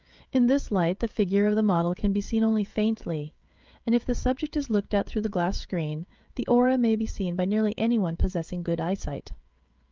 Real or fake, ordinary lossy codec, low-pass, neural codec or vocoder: fake; Opus, 24 kbps; 7.2 kHz; codec, 16 kHz, 16 kbps, FreqCodec, smaller model